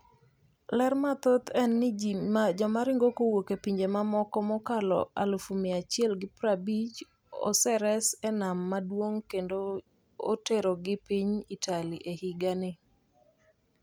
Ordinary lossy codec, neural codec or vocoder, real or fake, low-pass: none; none; real; none